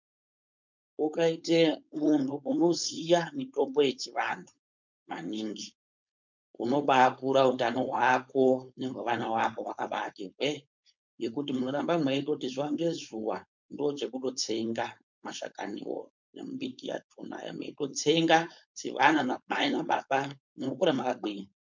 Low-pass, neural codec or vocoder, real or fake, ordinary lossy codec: 7.2 kHz; codec, 16 kHz, 4.8 kbps, FACodec; fake; MP3, 64 kbps